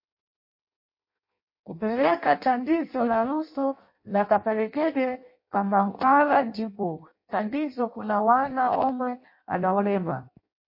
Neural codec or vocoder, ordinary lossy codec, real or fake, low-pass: codec, 16 kHz in and 24 kHz out, 0.6 kbps, FireRedTTS-2 codec; MP3, 32 kbps; fake; 5.4 kHz